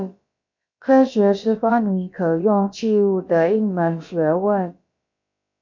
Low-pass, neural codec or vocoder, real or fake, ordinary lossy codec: 7.2 kHz; codec, 16 kHz, about 1 kbps, DyCAST, with the encoder's durations; fake; MP3, 64 kbps